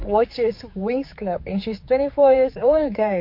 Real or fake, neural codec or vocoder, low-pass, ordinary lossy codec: fake; codec, 16 kHz, 4 kbps, X-Codec, HuBERT features, trained on general audio; 5.4 kHz; MP3, 32 kbps